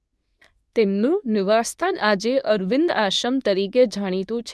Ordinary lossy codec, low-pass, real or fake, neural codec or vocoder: none; none; fake; codec, 24 kHz, 0.9 kbps, WavTokenizer, medium speech release version 2